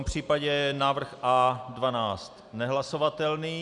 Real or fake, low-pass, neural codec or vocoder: real; 10.8 kHz; none